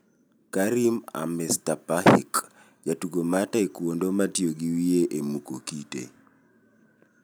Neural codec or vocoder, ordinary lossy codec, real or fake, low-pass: none; none; real; none